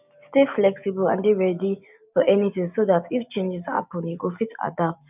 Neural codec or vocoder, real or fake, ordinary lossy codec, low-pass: none; real; none; 3.6 kHz